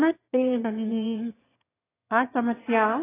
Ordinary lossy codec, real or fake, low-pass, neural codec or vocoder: AAC, 16 kbps; fake; 3.6 kHz; autoencoder, 22.05 kHz, a latent of 192 numbers a frame, VITS, trained on one speaker